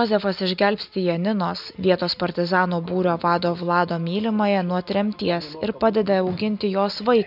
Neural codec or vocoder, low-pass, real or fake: none; 5.4 kHz; real